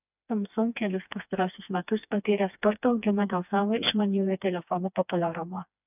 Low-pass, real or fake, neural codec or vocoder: 3.6 kHz; fake; codec, 16 kHz, 2 kbps, FreqCodec, smaller model